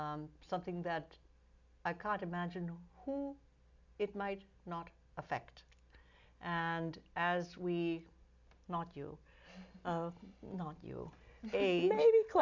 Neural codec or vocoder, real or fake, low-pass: none; real; 7.2 kHz